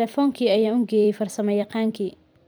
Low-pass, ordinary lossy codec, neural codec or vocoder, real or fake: none; none; vocoder, 44.1 kHz, 128 mel bands every 256 samples, BigVGAN v2; fake